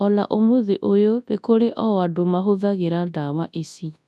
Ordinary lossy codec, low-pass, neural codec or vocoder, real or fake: none; none; codec, 24 kHz, 0.9 kbps, WavTokenizer, large speech release; fake